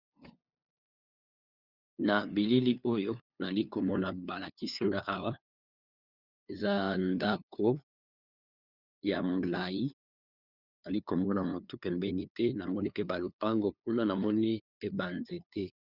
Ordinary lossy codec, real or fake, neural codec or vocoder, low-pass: Opus, 64 kbps; fake; codec, 16 kHz, 2 kbps, FunCodec, trained on LibriTTS, 25 frames a second; 5.4 kHz